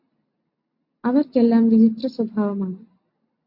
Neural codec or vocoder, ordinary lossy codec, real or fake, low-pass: none; MP3, 32 kbps; real; 5.4 kHz